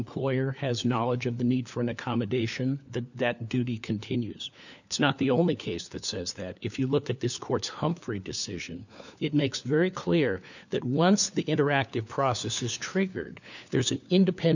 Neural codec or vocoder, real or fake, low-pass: codec, 16 kHz, 4 kbps, FunCodec, trained on LibriTTS, 50 frames a second; fake; 7.2 kHz